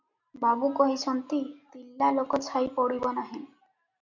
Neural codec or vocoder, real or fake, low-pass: none; real; 7.2 kHz